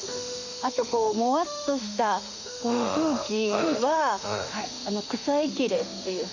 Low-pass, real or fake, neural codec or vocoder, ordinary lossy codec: 7.2 kHz; fake; autoencoder, 48 kHz, 32 numbers a frame, DAC-VAE, trained on Japanese speech; none